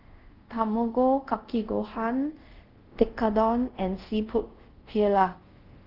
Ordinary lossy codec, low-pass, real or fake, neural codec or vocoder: Opus, 16 kbps; 5.4 kHz; fake; codec, 24 kHz, 0.5 kbps, DualCodec